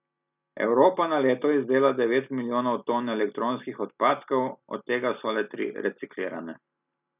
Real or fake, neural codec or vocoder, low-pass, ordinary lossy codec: real; none; 3.6 kHz; none